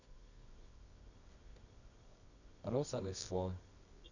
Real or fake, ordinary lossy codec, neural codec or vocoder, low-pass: fake; none; codec, 24 kHz, 0.9 kbps, WavTokenizer, medium music audio release; 7.2 kHz